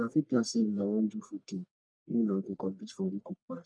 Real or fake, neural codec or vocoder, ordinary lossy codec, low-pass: fake; codec, 44.1 kHz, 1.7 kbps, Pupu-Codec; none; 9.9 kHz